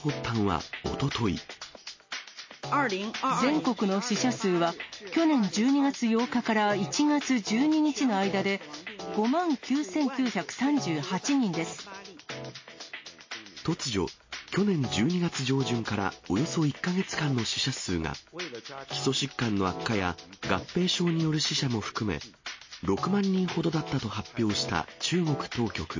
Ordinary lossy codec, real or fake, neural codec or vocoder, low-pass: MP3, 32 kbps; real; none; 7.2 kHz